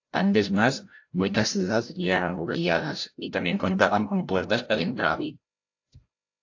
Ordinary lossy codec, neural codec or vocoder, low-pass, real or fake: AAC, 48 kbps; codec, 16 kHz, 0.5 kbps, FreqCodec, larger model; 7.2 kHz; fake